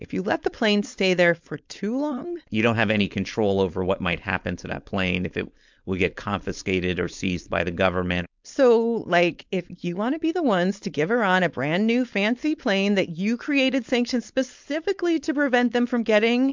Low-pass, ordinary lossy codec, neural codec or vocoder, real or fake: 7.2 kHz; MP3, 64 kbps; codec, 16 kHz, 4.8 kbps, FACodec; fake